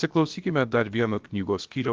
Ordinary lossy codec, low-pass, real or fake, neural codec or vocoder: Opus, 32 kbps; 7.2 kHz; fake; codec, 16 kHz, about 1 kbps, DyCAST, with the encoder's durations